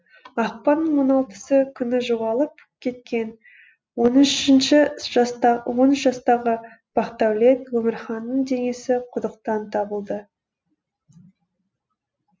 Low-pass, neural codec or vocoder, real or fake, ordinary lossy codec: none; none; real; none